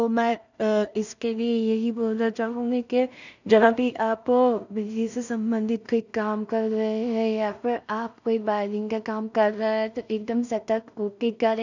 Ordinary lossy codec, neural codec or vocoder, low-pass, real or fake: none; codec, 16 kHz in and 24 kHz out, 0.4 kbps, LongCat-Audio-Codec, two codebook decoder; 7.2 kHz; fake